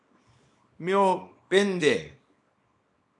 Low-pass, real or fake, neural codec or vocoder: 10.8 kHz; fake; codec, 24 kHz, 0.9 kbps, WavTokenizer, small release